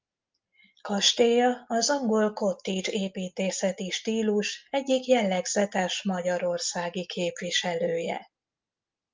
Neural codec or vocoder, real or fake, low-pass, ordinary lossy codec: none; real; 7.2 kHz; Opus, 24 kbps